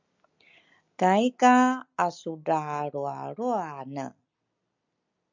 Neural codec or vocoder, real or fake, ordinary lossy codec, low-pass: none; real; MP3, 64 kbps; 7.2 kHz